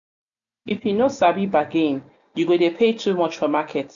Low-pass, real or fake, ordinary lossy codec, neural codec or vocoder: 7.2 kHz; real; none; none